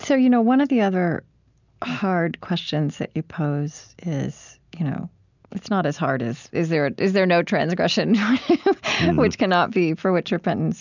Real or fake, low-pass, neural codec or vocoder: real; 7.2 kHz; none